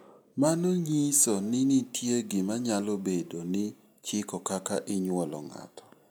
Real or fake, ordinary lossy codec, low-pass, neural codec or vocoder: real; none; none; none